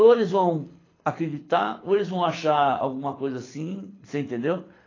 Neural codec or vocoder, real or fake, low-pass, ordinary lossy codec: codec, 24 kHz, 6 kbps, HILCodec; fake; 7.2 kHz; AAC, 32 kbps